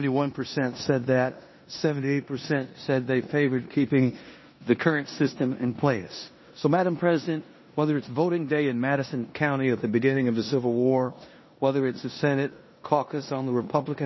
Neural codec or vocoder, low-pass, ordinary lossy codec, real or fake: codec, 16 kHz in and 24 kHz out, 0.9 kbps, LongCat-Audio-Codec, four codebook decoder; 7.2 kHz; MP3, 24 kbps; fake